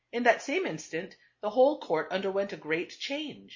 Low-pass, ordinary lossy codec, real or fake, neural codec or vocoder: 7.2 kHz; MP3, 32 kbps; real; none